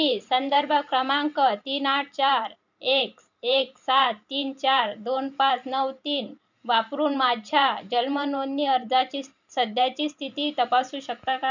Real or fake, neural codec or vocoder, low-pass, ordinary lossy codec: fake; vocoder, 44.1 kHz, 128 mel bands every 512 samples, BigVGAN v2; 7.2 kHz; none